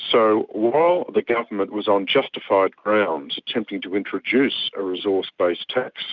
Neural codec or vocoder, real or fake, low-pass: none; real; 7.2 kHz